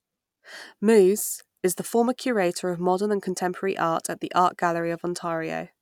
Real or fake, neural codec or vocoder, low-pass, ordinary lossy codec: real; none; 19.8 kHz; none